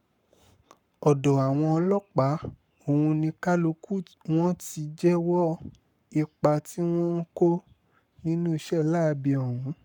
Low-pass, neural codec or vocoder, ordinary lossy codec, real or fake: 19.8 kHz; codec, 44.1 kHz, 7.8 kbps, Pupu-Codec; none; fake